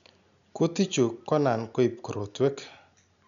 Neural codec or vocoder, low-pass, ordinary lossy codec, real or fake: none; 7.2 kHz; none; real